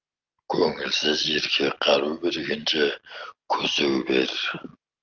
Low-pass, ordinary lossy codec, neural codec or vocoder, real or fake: 7.2 kHz; Opus, 24 kbps; none; real